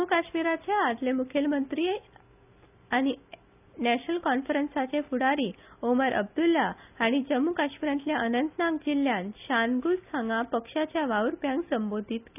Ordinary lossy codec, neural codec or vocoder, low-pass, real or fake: none; none; 3.6 kHz; real